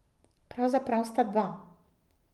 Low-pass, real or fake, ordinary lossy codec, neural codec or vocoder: 19.8 kHz; fake; Opus, 32 kbps; vocoder, 44.1 kHz, 128 mel bands every 512 samples, BigVGAN v2